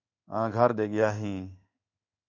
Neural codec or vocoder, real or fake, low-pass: codec, 16 kHz in and 24 kHz out, 1 kbps, XY-Tokenizer; fake; 7.2 kHz